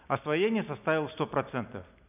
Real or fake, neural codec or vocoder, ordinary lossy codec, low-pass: real; none; none; 3.6 kHz